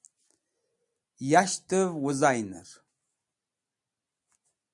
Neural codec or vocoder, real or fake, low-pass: none; real; 10.8 kHz